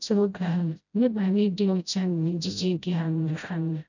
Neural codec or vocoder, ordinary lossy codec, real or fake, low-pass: codec, 16 kHz, 0.5 kbps, FreqCodec, smaller model; none; fake; 7.2 kHz